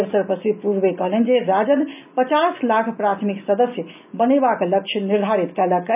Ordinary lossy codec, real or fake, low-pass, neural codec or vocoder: none; real; 3.6 kHz; none